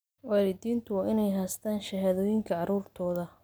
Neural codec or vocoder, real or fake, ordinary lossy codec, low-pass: none; real; none; none